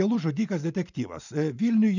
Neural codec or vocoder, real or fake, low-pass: vocoder, 44.1 kHz, 128 mel bands every 512 samples, BigVGAN v2; fake; 7.2 kHz